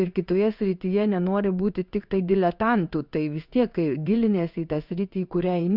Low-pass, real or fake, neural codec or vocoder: 5.4 kHz; fake; codec, 16 kHz in and 24 kHz out, 1 kbps, XY-Tokenizer